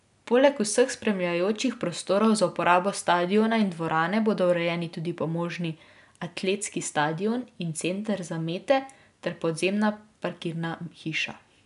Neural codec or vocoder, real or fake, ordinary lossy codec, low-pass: none; real; none; 10.8 kHz